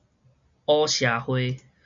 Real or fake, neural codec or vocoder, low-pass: real; none; 7.2 kHz